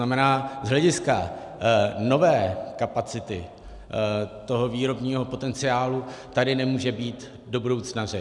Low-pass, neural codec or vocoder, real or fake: 10.8 kHz; none; real